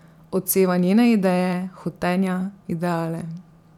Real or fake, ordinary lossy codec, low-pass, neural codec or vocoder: fake; none; 19.8 kHz; vocoder, 44.1 kHz, 128 mel bands every 512 samples, BigVGAN v2